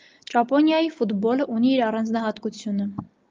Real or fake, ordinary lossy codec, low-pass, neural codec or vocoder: real; Opus, 32 kbps; 7.2 kHz; none